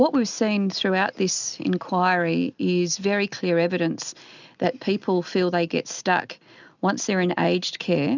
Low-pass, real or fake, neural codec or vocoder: 7.2 kHz; real; none